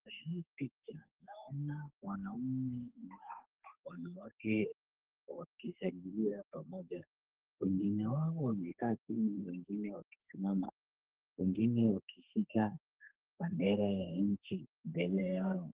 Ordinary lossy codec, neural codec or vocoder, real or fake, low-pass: Opus, 16 kbps; codec, 32 kHz, 1.9 kbps, SNAC; fake; 3.6 kHz